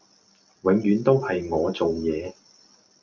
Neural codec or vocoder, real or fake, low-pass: none; real; 7.2 kHz